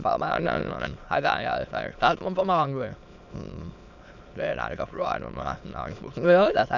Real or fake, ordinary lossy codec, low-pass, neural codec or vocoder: fake; none; 7.2 kHz; autoencoder, 22.05 kHz, a latent of 192 numbers a frame, VITS, trained on many speakers